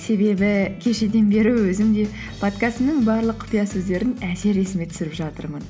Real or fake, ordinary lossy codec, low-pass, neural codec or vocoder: real; none; none; none